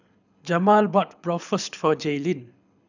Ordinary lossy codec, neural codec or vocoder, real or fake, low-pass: none; codec, 24 kHz, 6 kbps, HILCodec; fake; 7.2 kHz